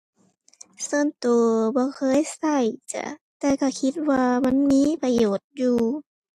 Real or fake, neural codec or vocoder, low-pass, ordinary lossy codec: real; none; 14.4 kHz; AAC, 64 kbps